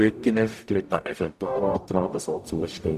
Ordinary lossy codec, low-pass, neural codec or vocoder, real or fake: none; 14.4 kHz; codec, 44.1 kHz, 0.9 kbps, DAC; fake